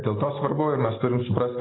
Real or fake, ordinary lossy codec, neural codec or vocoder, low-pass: real; AAC, 16 kbps; none; 7.2 kHz